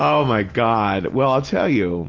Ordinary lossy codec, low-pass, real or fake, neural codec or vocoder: Opus, 32 kbps; 7.2 kHz; real; none